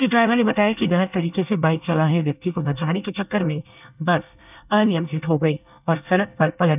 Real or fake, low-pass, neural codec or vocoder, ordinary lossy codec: fake; 3.6 kHz; codec, 24 kHz, 1 kbps, SNAC; none